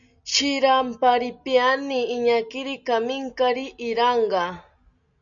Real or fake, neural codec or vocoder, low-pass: real; none; 7.2 kHz